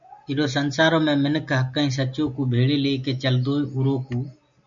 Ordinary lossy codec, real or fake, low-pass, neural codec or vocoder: MP3, 64 kbps; real; 7.2 kHz; none